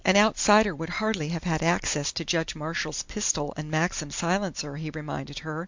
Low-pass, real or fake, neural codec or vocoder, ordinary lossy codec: 7.2 kHz; real; none; MP3, 64 kbps